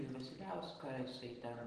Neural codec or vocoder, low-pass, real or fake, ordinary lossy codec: none; 9.9 kHz; real; Opus, 16 kbps